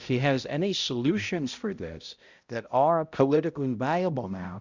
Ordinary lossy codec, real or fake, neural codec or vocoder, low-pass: Opus, 64 kbps; fake; codec, 16 kHz, 0.5 kbps, X-Codec, HuBERT features, trained on balanced general audio; 7.2 kHz